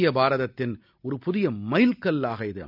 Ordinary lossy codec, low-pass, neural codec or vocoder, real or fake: none; 5.4 kHz; none; real